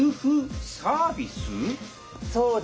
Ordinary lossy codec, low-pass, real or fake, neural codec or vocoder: none; none; real; none